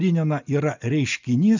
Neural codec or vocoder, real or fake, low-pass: none; real; 7.2 kHz